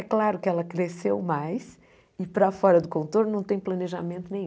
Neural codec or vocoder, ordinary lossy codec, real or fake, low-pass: none; none; real; none